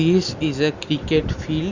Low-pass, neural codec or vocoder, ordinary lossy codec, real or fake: 7.2 kHz; none; Opus, 64 kbps; real